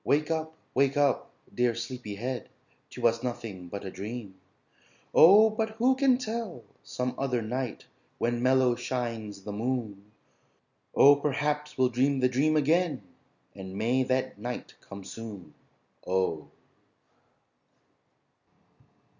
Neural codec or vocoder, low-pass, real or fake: none; 7.2 kHz; real